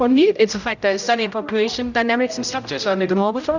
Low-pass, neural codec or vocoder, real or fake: 7.2 kHz; codec, 16 kHz, 0.5 kbps, X-Codec, HuBERT features, trained on general audio; fake